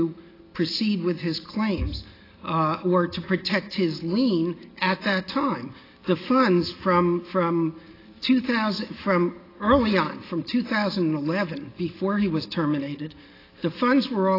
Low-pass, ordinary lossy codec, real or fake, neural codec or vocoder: 5.4 kHz; AAC, 24 kbps; real; none